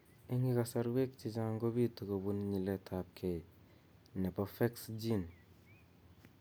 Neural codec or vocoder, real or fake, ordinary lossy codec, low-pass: none; real; none; none